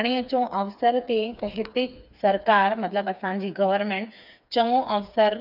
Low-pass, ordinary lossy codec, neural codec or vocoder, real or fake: 5.4 kHz; none; codec, 16 kHz, 4 kbps, FreqCodec, smaller model; fake